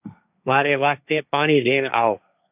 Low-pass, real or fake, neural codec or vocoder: 3.6 kHz; fake; codec, 16 kHz, 1.1 kbps, Voila-Tokenizer